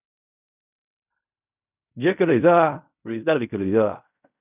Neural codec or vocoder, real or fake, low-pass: codec, 16 kHz in and 24 kHz out, 0.4 kbps, LongCat-Audio-Codec, fine tuned four codebook decoder; fake; 3.6 kHz